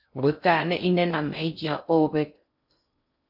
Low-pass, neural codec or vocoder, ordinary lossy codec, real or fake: 5.4 kHz; codec, 16 kHz in and 24 kHz out, 0.6 kbps, FocalCodec, streaming, 4096 codes; AAC, 48 kbps; fake